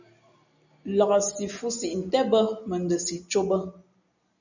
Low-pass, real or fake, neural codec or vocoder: 7.2 kHz; real; none